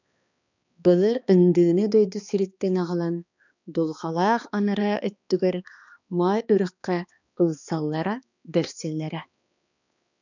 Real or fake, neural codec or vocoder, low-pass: fake; codec, 16 kHz, 2 kbps, X-Codec, HuBERT features, trained on balanced general audio; 7.2 kHz